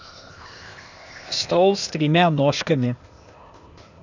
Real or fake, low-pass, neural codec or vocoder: fake; 7.2 kHz; codec, 16 kHz, 0.8 kbps, ZipCodec